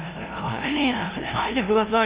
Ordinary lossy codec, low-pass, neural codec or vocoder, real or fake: Opus, 32 kbps; 3.6 kHz; codec, 16 kHz, 0.5 kbps, FunCodec, trained on LibriTTS, 25 frames a second; fake